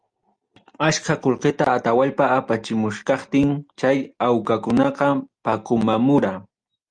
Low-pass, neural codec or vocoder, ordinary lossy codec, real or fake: 9.9 kHz; none; Opus, 32 kbps; real